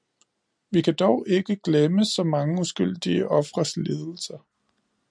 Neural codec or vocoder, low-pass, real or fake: none; 9.9 kHz; real